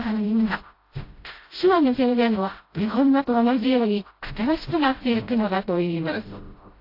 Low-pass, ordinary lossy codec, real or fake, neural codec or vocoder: 5.4 kHz; AAC, 32 kbps; fake; codec, 16 kHz, 0.5 kbps, FreqCodec, smaller model